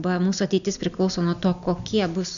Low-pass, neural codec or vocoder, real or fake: 7.2 kHz; none; real